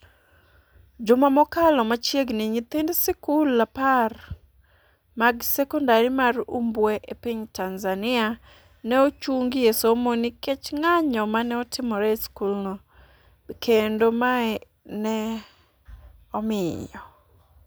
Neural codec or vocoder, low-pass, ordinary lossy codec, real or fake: none; none; none; real